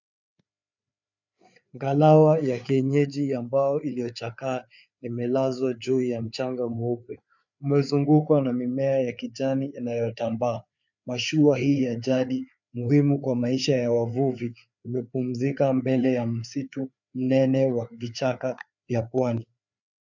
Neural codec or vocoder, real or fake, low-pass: codec, 16 kHz, 4 kbps, FreqCodec, larger model; fake; 7.2 kHz